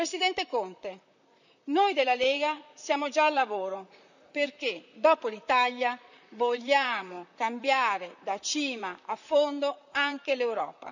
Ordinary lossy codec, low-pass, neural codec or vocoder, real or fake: none; 7.2 kHz; vocoder, 44.1 kHz, 128 mel bands, Pupu-Vocoder; fake